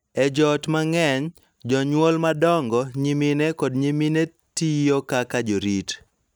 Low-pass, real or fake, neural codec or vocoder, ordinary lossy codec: none; real; none; none